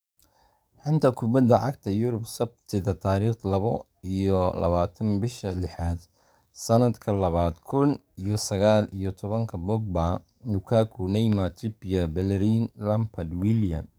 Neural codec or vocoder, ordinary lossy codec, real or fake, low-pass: codec, 44.1 kHz, 7.8 kbps, DAC; none; fake; none